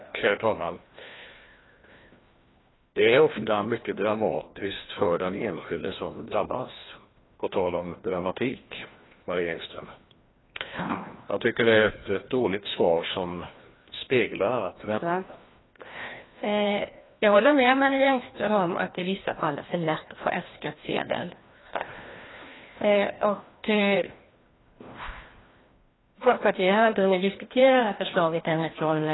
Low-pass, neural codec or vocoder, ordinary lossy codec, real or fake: 7.2 kHz; codec, 16 kHz, 1 kbps, FreqCodec, larger model; AAC, 16 kbps; fake